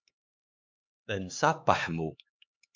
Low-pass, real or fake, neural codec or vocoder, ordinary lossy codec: 7.2 kHz; fake; codec, 16 kHz, 4 kbps, X-Codec, HuBERT features, trained on LibriSpeech; MP3, 64 kbps